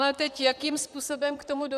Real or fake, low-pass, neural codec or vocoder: fake; 14.4 kHz; vocoder, 44.1 kHz, 128 mel bands, Pupu-Vocoder